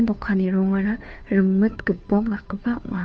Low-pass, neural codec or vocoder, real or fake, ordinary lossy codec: none; codec, 16 kHz, 2 kbps, FunCodec, trained on Chinese and English, 25 frames a second; fake; none